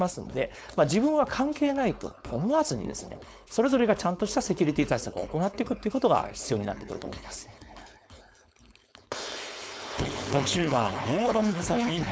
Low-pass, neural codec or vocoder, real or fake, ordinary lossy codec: none; codec, 16 kHz, 4.8 kbps, FACodec; fake; none